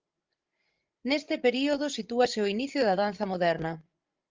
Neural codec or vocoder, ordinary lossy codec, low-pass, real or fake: codec, 16 kHz, 16 kbps, FreqCodec, larger model; Opus, 32 kbps; 7.2 kHz; fake